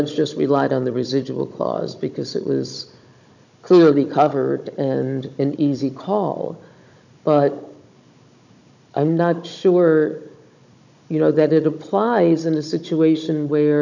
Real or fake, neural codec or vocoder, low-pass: fake; codec, 16 kHz, 16 kbps, FunCodec, trained on Chinese and English, 50 frames a second; 7.2 kHz